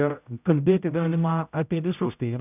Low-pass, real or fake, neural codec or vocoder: 3.6 kHz; fake; codec, 16 kHz, 0.5 kbps, X-Codec, HuBERT features, trained on general audio